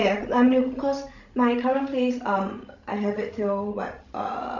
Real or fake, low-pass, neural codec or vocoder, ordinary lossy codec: fake; 7.2 kHz; codec, 16 kHz, 16 kbps, FreqCodec, larger model; none